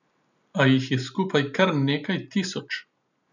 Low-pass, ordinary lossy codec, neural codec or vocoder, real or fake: 7.2 kHz; none; none; real